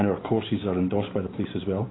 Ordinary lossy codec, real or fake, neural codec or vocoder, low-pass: AAC, 16 kbps; real; none; 7.2 kHz